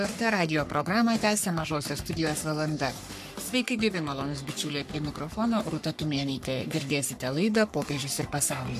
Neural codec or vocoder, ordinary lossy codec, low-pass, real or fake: codec, 44.1 kHz, 3.4 kbps, Pupu-Codec; AAC, 96 kbps; 14.4 kHz; fake